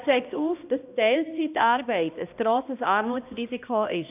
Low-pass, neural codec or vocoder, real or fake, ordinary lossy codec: 3.6 kHz; codec, 16 kHz, 1 kbps, X-Codec, HuBERT features, trained on balanced general audio; fake; AAC, 32 kbps